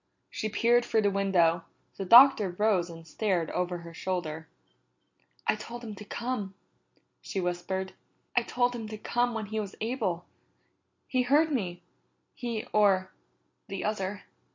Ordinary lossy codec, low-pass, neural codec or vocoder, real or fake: MP3, 48 kbps; 7.2 kHz; none; real